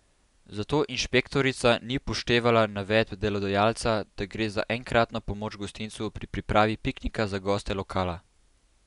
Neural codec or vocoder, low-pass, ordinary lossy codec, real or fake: none; 10.8 kHz; none; real